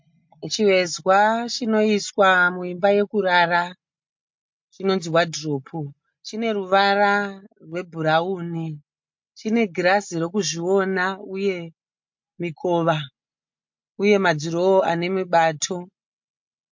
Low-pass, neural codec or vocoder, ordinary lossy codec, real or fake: 7.2 kHz; none; MP3, 48 kbps; real